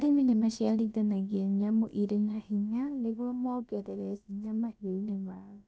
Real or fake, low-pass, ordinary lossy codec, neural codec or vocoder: fake; none; none; codec, 16 kHz, about 1 kbps, DyCAST, with the encoder's durations